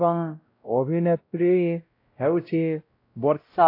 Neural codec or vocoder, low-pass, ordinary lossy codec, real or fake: codec, 16 kHz, 0.5 kbps, X-Codec, WavLM features, trained on Multilingual LibriSpeech; 5.4 kHz; AAC, 32 kbps; fake